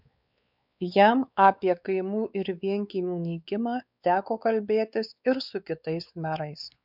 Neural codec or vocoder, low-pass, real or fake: codec, 16 kHz, 4 kbps, X-Codec, WavLM features, trained on Multilingual LibriSpeech; 5.4 kHz; fake